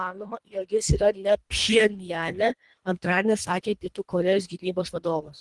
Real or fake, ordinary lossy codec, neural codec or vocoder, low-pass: fake; Opus, 24 kbps; codec, 24 kHz, 1.5 kbps, HILCodec; 10.8 kHz